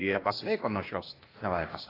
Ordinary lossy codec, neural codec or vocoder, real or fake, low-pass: AAC, 24 kbps; codec, 16 kHz, 0.8 kbps, ZipCodec; fake; 5.4 kHz